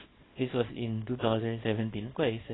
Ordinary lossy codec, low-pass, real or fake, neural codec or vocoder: AAC, 16 kbps; 7.2 kHz; fake; codec, 24 kHz, 0.9 kbps, WavTokenizer, small release